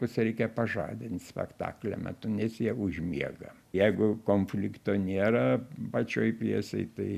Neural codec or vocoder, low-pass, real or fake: none; 14.4 kHz; real